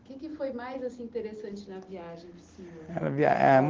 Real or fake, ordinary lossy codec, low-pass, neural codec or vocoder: real; Opus, 16 kbps; 7.2 kHz; none